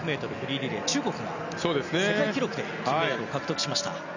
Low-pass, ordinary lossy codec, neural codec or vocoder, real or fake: 7.2 kHz; none; none; real